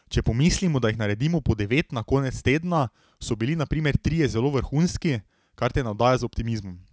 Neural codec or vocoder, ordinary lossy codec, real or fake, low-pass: none; none; real; none